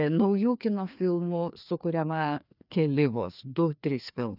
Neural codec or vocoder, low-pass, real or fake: codec, 16 kHz, 2 kbps, FreqCodec, larger model; 5.4 kHz; fake